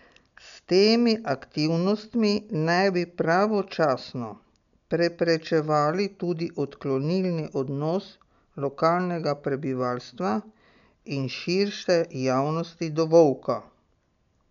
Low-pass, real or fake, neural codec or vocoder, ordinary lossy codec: 7.2 kHz; real; none; none